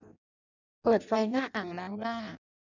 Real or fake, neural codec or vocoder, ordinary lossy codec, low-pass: fake; codec, 16 kHz in and 24 kHz out, 0.6 kbps, FireRedTTS-2 codec; none; 7.2 kHz